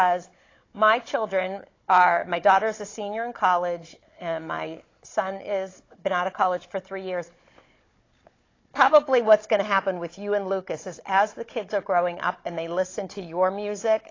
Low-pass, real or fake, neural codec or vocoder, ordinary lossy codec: 7.2 kHz; fake; codec, 16 kHz, 16 kbps, FunCodec, trained on Chinese and English, 50 frames a second; AAC, 32 kbps